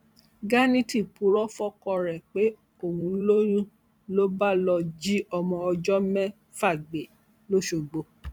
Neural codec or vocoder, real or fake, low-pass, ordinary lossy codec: vocoder, 44.1 kHz, 128 mel bands every 512 samples, BigVGAN v2; fake; 19.8 kHz; none